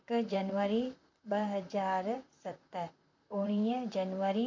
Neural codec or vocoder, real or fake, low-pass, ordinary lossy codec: vocoder, 44.1 kHz, 128 mel bands, Pupu-Vocoder; fake; 7.2 kHz; MP3, 64 kbps